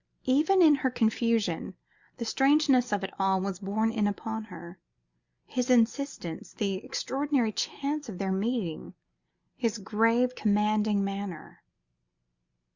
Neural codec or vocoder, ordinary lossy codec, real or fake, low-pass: none; Opus, 64 kbps; real; 7.2 kHz